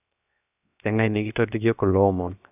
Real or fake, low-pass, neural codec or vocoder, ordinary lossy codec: fake; 3.6 kHz; codec, 16 kHz, 0.7 kbps, FocalCodec; none